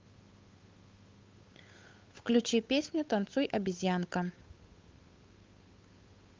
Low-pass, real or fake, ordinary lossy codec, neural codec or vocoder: 7.2 kHz; fake; Opus, 32 kbps; codec, 16 kHz, 8 kbps, FunCodec, trained on Chinese and English, 25 frames a second